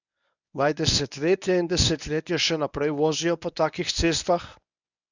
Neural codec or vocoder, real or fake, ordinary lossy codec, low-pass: codec, 24 kHz, 0.9 kbps, WavTokenizer, medium speech release version 1; fake; none; 7.2 kHz